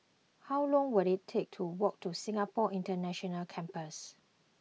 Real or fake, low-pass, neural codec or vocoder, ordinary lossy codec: real; none; none; none